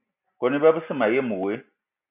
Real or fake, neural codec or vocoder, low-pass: real; none; 3.6 kHz